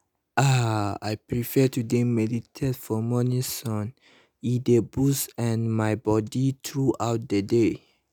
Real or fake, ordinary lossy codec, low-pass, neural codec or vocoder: real; none; none; none